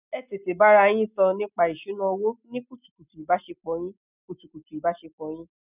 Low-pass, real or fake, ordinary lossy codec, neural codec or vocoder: 3.6 kHz; real; none; none